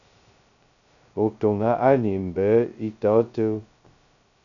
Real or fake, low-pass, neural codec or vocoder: fake; 7.2 kHz; codec, 16 kHz, 0.2 kbps, FocalCodec